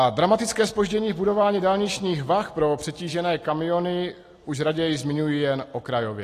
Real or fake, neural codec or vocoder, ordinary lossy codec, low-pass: real; none; AAC, 48 kbps; 14.4 kHz